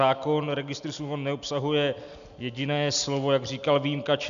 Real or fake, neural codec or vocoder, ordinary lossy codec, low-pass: real; none; MP3, 96 kbps; 7.2 kHz